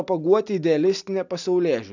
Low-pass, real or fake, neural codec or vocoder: 7.2 kHz; real; none